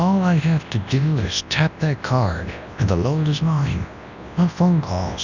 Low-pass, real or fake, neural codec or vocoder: 7.2 kHz; fake; codec, 24 kHz, 0.9 kbps, WavTokenizer, large speech release